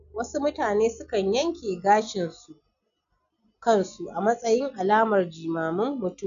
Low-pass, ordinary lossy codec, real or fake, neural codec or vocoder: 7.2 kHz; none; real; none